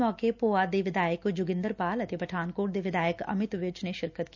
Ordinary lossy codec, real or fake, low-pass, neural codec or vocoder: none; real; 7.2 kHz; none